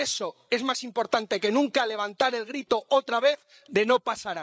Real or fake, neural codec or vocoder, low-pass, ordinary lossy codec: fake; codec, 16 kHz, 8 kbps, FreqCodec, larger model; none; none